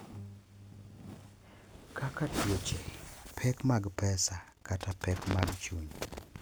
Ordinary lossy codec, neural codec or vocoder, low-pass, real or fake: none; none; none; real